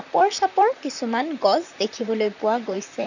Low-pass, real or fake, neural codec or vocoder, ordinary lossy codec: 7.2 kHz; fake; vocoder, 44.1 kHz, 128 mel bands, Pupu-Vocoder; none